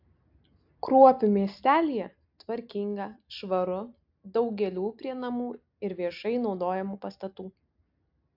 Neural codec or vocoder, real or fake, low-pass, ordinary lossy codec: none; real; 5.4 kHz; AAC, 48 kbps